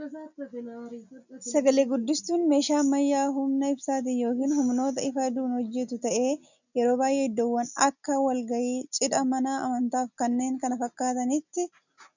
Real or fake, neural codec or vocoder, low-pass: real; none; 7.2 kHz